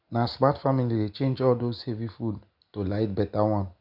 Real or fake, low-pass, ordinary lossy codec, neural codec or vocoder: real; 5.4 kHz; none; none